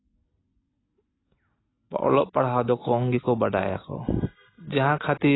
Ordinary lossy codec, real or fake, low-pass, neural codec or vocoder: AAC, 16 kbps; real; 7.2 kHz; none